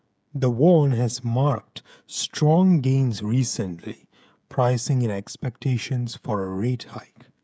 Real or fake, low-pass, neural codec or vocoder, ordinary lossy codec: fake; none; codec, 16 kHz, 16 kbps, FreqCodec, smaller model; none